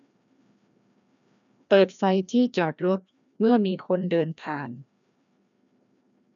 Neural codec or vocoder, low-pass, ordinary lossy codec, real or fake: codec, 16 kHz, 1 kbps, FreqCodec, larger model; 7.2 kHz; none; fake